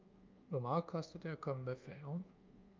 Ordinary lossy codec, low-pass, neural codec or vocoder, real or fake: Opus, 24 kbps; 7.2 kHz; codec, 24 kHz, 1.2 kbps, DualCodec; fake